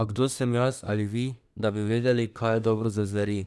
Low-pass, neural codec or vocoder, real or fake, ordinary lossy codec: none; codec, 24 kHz, 1 kbps, SNAC; fake; none